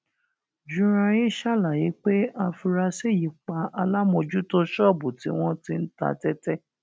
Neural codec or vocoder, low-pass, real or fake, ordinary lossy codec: none; none; real; none